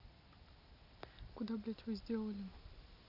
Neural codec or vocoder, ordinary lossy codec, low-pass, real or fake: none; none; 5.4 kHz; real